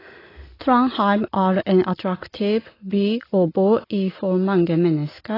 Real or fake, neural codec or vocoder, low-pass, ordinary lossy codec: real; none; 5.4 kHz; AAC, 24 kbps